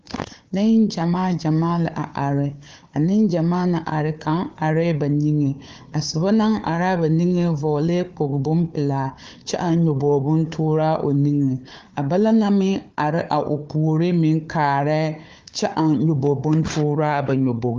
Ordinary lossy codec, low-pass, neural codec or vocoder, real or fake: Opus, 32 kbps; 7.2 kHz; codec, 16 kHz, 4 kbps, FunCodec, trained on Chinese and English, 50 frames a second; fake